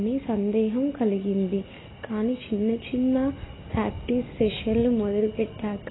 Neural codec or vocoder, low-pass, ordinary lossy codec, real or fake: none; 7.2 kHz; AAC, 16 kbps; real